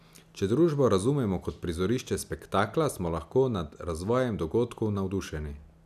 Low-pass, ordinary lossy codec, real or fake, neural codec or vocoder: 14.4 kHz; none; real; none